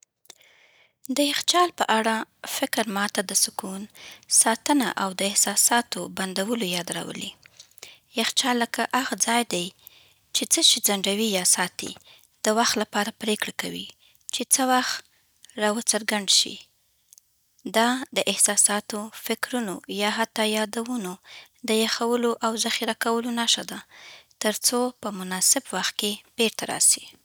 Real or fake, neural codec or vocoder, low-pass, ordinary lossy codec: real; none; none; none